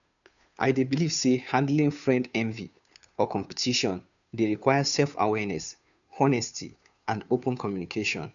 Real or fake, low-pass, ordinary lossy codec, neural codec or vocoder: fake; 7.2 kHz; none; codec, 16 kHz, 2 kbps, FunCodec, trained on Chinese and English, 25 frames a second